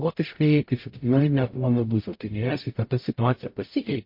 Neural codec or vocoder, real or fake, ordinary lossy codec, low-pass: codec, 44.1 kHz, 0.9 kbps, DAC; fake; MP3, 32 kbps; 5.4 kHz